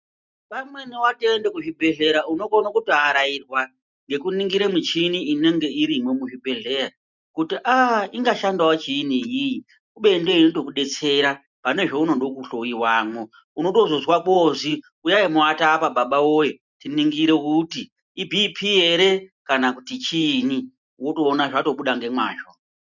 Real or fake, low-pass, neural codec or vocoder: real; 7.2 kHz; none